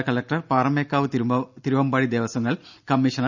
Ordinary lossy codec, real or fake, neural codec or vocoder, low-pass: none; real; none; 7.2 kHz